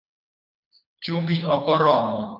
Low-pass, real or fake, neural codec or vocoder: 5.4 kHz; fake; codec, 24 kHz, 3 kbps, HILCodec